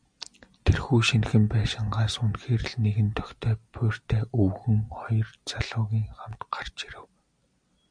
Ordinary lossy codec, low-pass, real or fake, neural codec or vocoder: MP3, 96 kbps; 9.9 kHz; real; none